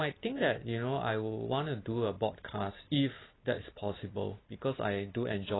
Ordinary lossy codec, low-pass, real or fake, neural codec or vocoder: AAC, 16 kbps; 7.2 kHz; real; none